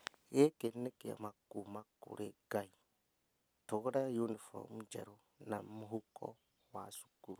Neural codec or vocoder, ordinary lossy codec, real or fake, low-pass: none; none; real; none